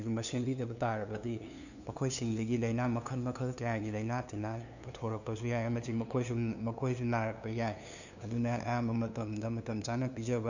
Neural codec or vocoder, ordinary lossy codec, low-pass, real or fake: codec, 16 kHz, 2 kbps, FunCodec, trained on LibriTTS, 25 frames a second; none; 7.2 kHz; fake